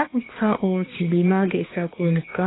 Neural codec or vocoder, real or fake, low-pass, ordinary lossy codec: codec, 16 kHz, 2 kbps, X-Codec, HuBERT features, trained on balanced general audio; fake; 7.2 kHz; AAC, 16 kbps